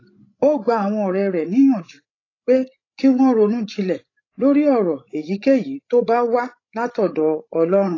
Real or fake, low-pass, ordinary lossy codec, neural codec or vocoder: fake; 7.2 kHz; AAC, 32 kbps; codec, 16 kHz, 16 kbps, FreqCodec, larger model